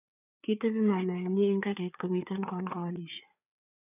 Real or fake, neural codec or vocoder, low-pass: fake; codec, 16 kHz, 4 kbps, FreqCodec, larger model; 3.6 kHz